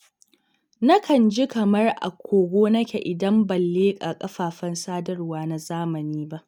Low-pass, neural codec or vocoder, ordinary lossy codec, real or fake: 19.8 kHz; none; none; real